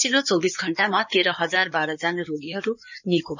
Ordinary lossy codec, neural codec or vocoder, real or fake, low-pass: none; codec, 16 kHz in and 24 kHz out, 2.2 kbps, FireRedTTS-2 codec; fake; 7.2 kHz